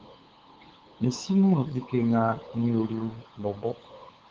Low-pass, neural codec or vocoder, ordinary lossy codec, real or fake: 7.2 kHz; codec, 16 kHz, 8 kbps, FunCodec, trained on LibriTTS, 25 frames a second; Opus, 16 kbps; fake